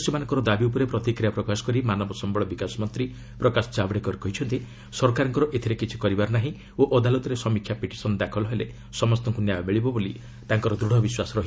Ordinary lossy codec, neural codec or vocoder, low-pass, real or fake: none; none; none; real